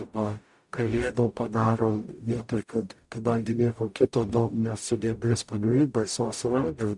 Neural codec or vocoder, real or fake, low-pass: codec, 44.1 kHz, 0.9 kbps, DAC; fake; 10.8 kHz